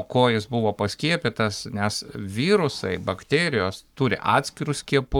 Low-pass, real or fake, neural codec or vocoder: 19.8 kHz; fake; codec, 44.1 kHz, 7.8 kbps, Pupu-Codec